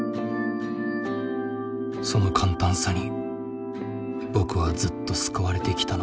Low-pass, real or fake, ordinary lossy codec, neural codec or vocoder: none; real; none; none